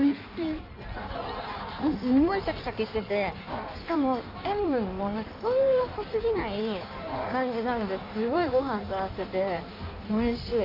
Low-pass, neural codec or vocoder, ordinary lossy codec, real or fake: 5.4 kHz; codec, 16 kHz in and 24 kHz out, 1.1 kbps, FireRedTTS-2 codec; AAC, 48 kbps; fake